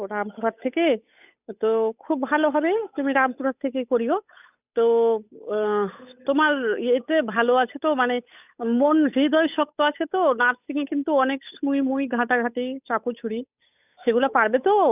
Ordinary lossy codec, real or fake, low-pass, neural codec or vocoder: none; fake; 3.6 kHz; codec, 16 kHz, 8 kbps, FunCodec, trained on Chinese and English, 25 frames a second